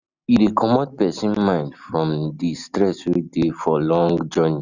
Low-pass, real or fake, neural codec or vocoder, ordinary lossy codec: 7.2 kHz; real; none; none